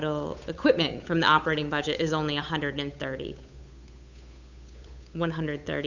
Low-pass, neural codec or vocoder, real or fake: 7.2 kHz; codec, 16 kHz, 8 kbps, FunCodec, trained on Chinese and English, 25 frames a second; fake